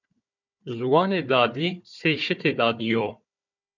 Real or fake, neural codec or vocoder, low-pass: fake; codec, 16 kHz, 4 kbps, FunCodec, trained on Chinese and English, 50 frames a second; 7.2 kHz